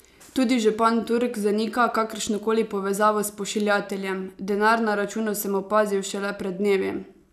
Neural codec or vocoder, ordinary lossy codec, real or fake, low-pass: none; none; real; 14.4 kHz